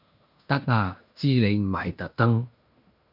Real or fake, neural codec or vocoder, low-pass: fake; codec, 16 kHz in and 24 kHz out, 0.9 kbps, LongCat-Audio-Codec, fine tuned four codebook decoder; 5.4 kHz